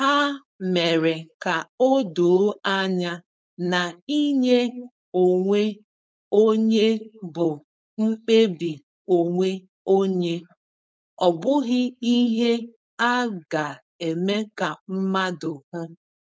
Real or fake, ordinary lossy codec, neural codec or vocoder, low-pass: fake; none; codec, 16 kHz, 4.8 kbps, FACodec; none